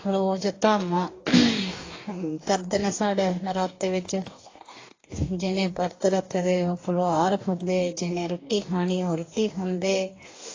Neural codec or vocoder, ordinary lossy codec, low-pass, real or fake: codec, 44.1 kHz, 2.6 kbps, DAC; AAC, 32 kbps; 7.2 kHz; fake